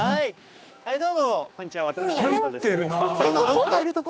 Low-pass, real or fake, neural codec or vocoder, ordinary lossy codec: none; fake; codec, 16 kHz, 2 kbps, X-Codec, HuBERT features, trained on general audio; none